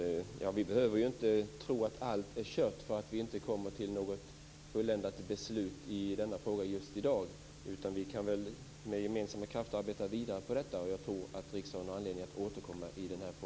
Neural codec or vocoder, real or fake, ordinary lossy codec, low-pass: none; real; none; none